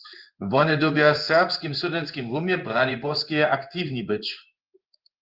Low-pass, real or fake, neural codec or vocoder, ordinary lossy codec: 5.4 kHz; fake; codec, 16 kHz in and 24 kHz out, 1 kbps, XY-Tokenizer; Opus, 24 kbps